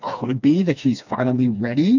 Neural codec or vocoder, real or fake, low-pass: codec, 16 kHz, 2 kbps, FreqCodec, smaller model; fake; 7.2 kHz